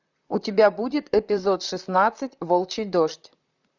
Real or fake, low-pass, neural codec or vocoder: fake; 7.2 kHz; vocoder, 44.1 kHz, 128 mel bands, Pupu-Vocoder